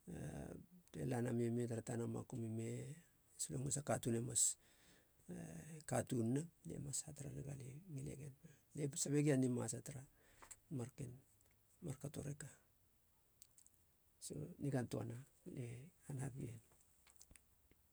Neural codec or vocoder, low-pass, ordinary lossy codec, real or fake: none; none; none; real